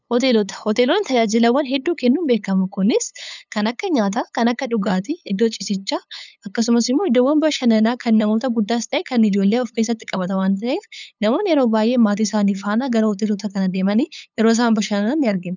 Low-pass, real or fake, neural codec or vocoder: 7.2 kHz; fake; codec, 16 kHz, 8 kbps, FunCodec, trained on LibriTTS, 25 frames a second